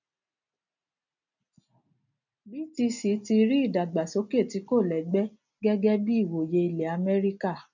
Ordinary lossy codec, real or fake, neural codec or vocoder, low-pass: none; real; none; 7.2 kHz